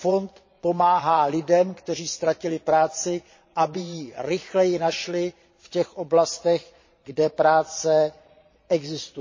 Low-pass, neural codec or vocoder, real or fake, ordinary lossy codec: 7.2 kHz; vocoder, 22.05 kHz, 80 mel bands, Vocos; fake; MP3, 32 kbps